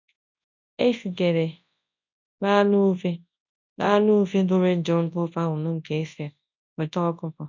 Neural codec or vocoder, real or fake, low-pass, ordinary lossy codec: codec, 24 kHz, 0.9 kbps, WavTokenizer, large speech release; fake; 7.2 kHz; MP3, 64 kbps